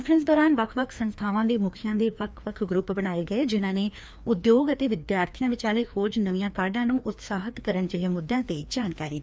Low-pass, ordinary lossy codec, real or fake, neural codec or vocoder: none; none; fake; codec, 16 kHz, 2 kbps, FreqCodec, larger model